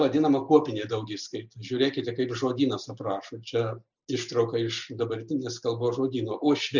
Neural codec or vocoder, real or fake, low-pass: none; real; 7.2 kHz